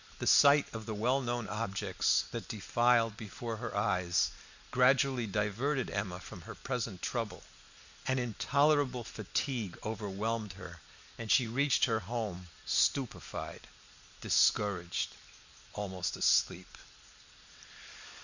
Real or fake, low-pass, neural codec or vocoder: real; 7.2 kHz; none